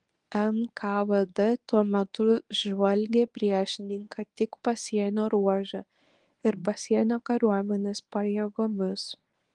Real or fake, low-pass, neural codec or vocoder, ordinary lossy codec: fake; 10.8 kHz; codec, 24 kHz, 0.9 kbps, WavTokenizer, medium speech release version 1; Opus, 24 kbps